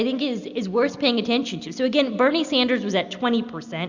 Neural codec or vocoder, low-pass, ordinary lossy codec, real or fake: none; 7.2 kHz; Opus, 64 kbps; real